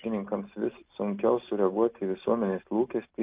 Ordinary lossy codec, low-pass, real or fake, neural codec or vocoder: Opus, 24 kbps; 3.6 kHz; real; none